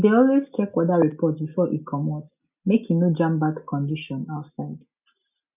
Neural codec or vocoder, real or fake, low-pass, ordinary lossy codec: none; real; 3.6 kHz; none